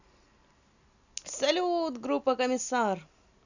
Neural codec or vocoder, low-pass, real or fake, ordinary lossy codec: none; 7.2 kHz; real; none